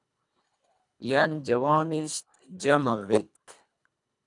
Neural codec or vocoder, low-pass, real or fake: codec, 24 kHz, 1.5 kbps, HILCodec; 10.8 kHz; fake